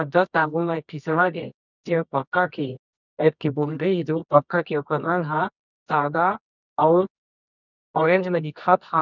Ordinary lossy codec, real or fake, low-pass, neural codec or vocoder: none; fake; 7.2 kHz; codec, 24 kHz, 0.9 kbps, WavTokenizer, medium music audio release